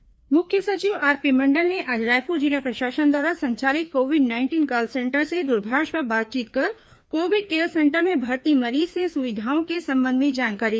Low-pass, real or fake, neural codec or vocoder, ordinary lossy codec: none; fake; codec, 16 kHz, 2 kbps, FreqCodec, larger model; none